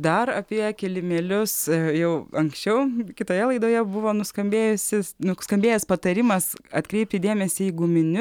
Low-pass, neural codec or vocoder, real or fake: 19.8 kHz; none; real